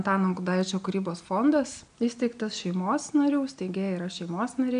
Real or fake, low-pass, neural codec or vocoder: real; 9.9 kHz; none